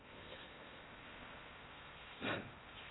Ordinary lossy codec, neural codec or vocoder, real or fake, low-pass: AAC, 16 kbps; codec, 16 kHz in and 24 kHz out, 0.6 kbps, FocalCodec, streaming, 4096 codes; fake; 7.2 kHz